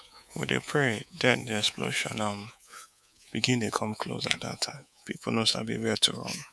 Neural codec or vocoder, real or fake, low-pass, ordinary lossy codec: codec, 24 kHz, 3.1 kbps, DualCodec; fake; none; none